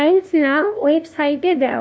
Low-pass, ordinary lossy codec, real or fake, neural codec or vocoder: none; none; fake; codec, 16 kHz, 0.5 kbps, FunCodec, trained on LibriTTS, 25 frames a second